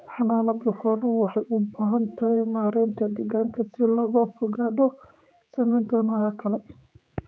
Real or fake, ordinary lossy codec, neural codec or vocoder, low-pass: fake; none; codec, 16 kHz, 4 kbps, X-Codec, HuBERT features, trained on general audio; none